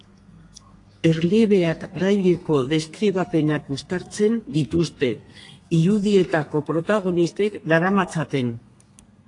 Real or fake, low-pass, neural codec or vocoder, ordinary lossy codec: fake; 10.8 kHz; codec, 32 kHz, 1.9 kbps, SNAC; AAC, 48 kbps